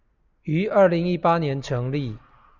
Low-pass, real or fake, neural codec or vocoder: 7.2 kHz; real; none